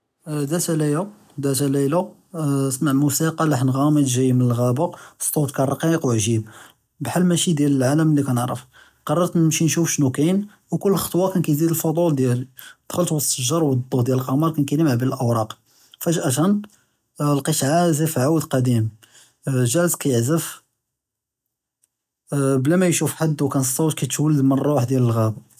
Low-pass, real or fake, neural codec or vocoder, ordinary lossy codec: 14.4 kHz; real; none; none